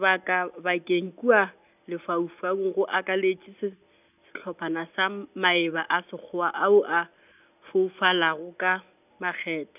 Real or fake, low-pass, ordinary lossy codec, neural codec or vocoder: real; 3.6 kHz; none; none